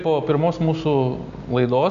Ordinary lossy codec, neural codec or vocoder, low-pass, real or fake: AAC, 96 kbps; none; 7.2 kHz; real